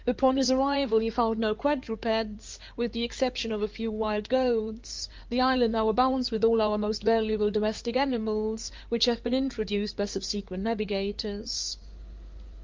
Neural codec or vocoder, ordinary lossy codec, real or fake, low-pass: codec, 16 kHz in and 24 kHz out, 2.2 kbps, FireRedTTS-2 codec; Opus, 24 kbps; fake; 7.2 kHz